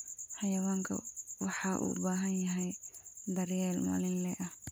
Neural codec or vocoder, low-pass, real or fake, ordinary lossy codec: none; none; real; none